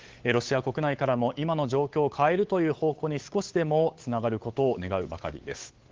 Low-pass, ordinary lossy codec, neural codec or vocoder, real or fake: 7.2 kHz; Opus, 16 kbps; codec, 16 kHz, 8 kbps, FunCodec, trained on Chinese and English, 25 frames a second; fake